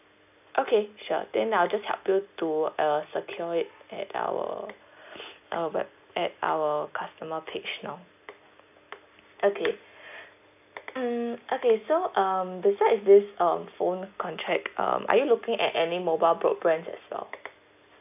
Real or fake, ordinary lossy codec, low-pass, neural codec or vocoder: real; none; 3.6 kHz; none